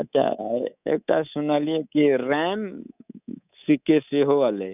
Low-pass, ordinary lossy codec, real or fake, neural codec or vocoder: 3.6 kHz; none; fake; codec, 24 kHz, 3.1 kbps, DualCodec